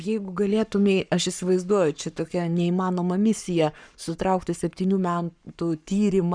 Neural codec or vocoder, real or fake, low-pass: codec, 44.1 kHz, 7.8 kbps, Pupu-Codec; fake; 9.9 kHz